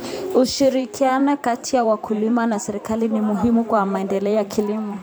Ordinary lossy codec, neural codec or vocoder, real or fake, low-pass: none; vocoder, 44.1 kHz, 128 mel bands every 512 samples, BigVGAN v2; fake; none